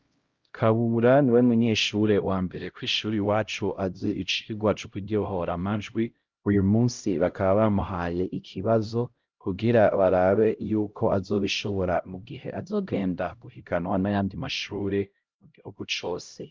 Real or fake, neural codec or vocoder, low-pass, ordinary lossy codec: fake; codec, 16 kHz, 0.5 kbps, X-Codec, HuBERT features, trained on LibriSpeech; 7.2 kHz; Opus, 24 kbps